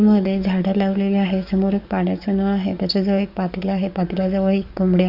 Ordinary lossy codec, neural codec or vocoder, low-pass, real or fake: none; codec, 44.1 kHz, 7.8 kbps, DAC; 5.4 kHz; fake